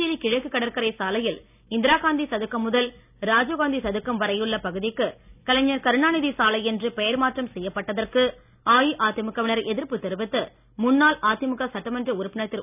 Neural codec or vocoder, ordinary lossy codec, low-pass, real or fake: none; none; 3.6 kHz; real